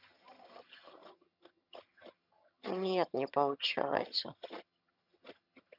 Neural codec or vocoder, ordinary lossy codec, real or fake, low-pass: vocoder, 22.05 kHz, 80 mel bands, HiFi-GAN; none; fake; 5.4 kHz